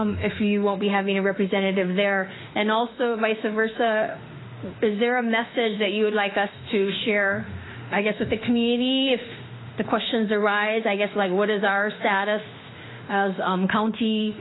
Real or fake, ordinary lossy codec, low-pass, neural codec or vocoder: fake; AAC, 16 kbps; 7.2 kHz; autoencoder, 48 kHz, 32 numbers a frame, DAC-VAE, trained on Japanese speech